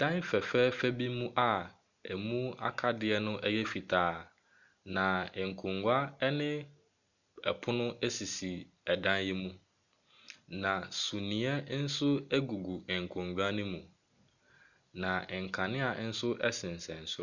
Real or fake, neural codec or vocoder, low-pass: real; none; 7.2 kHz